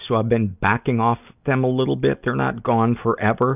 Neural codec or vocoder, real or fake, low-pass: none; real; 3.6 kHz